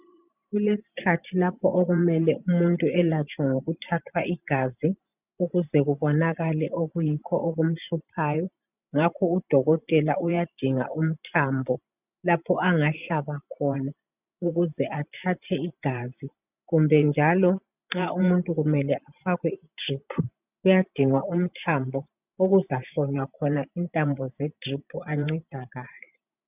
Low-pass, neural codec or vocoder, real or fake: 3.6 kHz; vocoder, 44.1 kHz, 128 mel bands every 512 samples, BigVGAN v2; fake